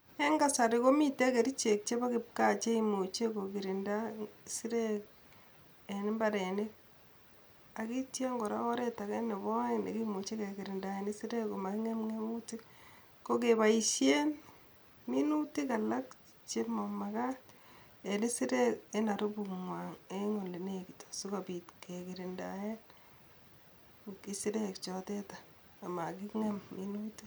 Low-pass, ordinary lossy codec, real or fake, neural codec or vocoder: none; none; real; none